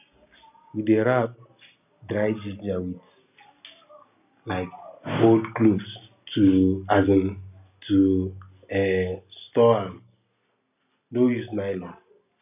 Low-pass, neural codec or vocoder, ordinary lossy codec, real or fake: 3.6 kHz; none; none; real